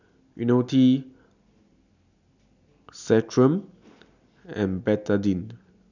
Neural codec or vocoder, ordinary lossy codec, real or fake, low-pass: none; none; real; 7.2 kHz